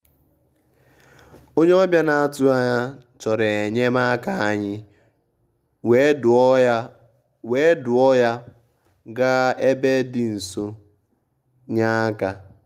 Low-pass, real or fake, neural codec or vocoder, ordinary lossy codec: 14.4 kHz; real; none; Opus, 32 kbps